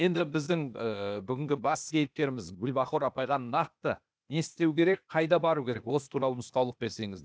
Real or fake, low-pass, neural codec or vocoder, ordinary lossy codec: fake; none; codec, 16 kHz, 0.8 kbps, ZipCodec; none